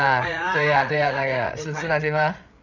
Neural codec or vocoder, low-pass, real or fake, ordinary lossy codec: codec, 16 kHz, 8 kbps, FreqCodec, larger model; 7.2 kHz; fake; none